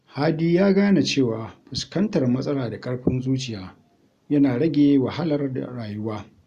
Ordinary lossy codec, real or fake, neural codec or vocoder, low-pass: Opus, 64 kbps; real; none; 14.4 kHz